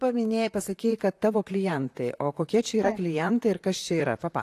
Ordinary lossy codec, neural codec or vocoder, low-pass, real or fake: AAC, 64 kbps; vocoder, 44.1 kHz, 128 mel bands, Pupu-Vocoder; 14.4 kHz; fake